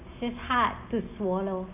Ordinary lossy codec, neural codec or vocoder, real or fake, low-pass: none; none; real; 3.6 kHz